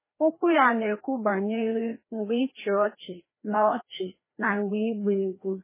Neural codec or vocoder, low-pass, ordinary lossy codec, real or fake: codec, 16 kHz, 1 kbps, FreqCodec, larger model; 3.6 kHz; MP3, 16 kbps; fake